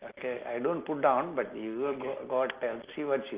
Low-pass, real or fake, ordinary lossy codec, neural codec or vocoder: 3.6 kHz; real; Opus, 32 kbps; none